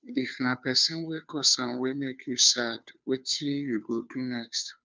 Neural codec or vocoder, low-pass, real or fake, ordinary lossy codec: codec, 16 kHz, 2 kbps, FunCodec, trained on Chinese and English, 25 frames a second; none; fake; none